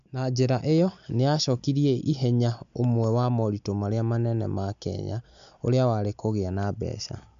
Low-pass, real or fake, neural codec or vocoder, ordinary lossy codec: 7.2 kHz; real; none; MP3, 64 kbps